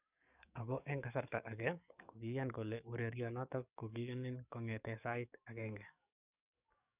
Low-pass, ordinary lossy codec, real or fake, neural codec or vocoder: 3.6 kHz; none; fake; codec, 44.1 kHz, 7.8 kbps, DAC